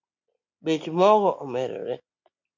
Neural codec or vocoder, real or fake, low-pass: none; real; 7.2 kHz